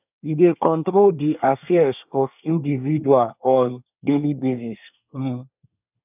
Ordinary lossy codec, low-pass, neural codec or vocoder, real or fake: none; 3.6 kHz; codec, 24 kHz, 1 kbps, SNAC; fake